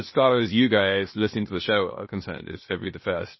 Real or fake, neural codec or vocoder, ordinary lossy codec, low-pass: fake; codec, 16 kHz, 0.8 kbps, ZipCodec; MP3, 24 kbps; 7.2 kHz